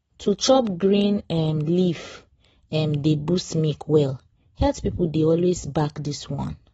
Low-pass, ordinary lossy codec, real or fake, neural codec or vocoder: 19.8 kHz; AAC, 24 kbps; real; none